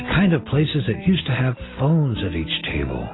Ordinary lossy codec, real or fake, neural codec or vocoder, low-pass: AAC, 16 kbps; real; none; 7.2 kHz